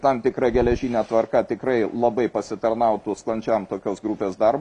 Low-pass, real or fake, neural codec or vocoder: 10.8 kHz; real; none